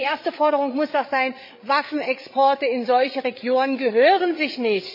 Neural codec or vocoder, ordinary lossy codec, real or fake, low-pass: codec, 16 kHz, 16 kbps, FreqCodec, larger model; MP3, 32 kbps; fake; 5.4 kHz